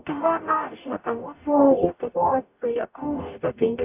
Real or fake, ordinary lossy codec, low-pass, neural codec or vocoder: fake; AAC, 32 kbps; 3.6 kHz; codec, 44.1 kHz, 0.9 kbps, DAC